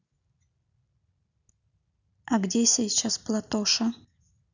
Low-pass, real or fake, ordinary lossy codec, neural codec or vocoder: 7.2 kHz; real; none; none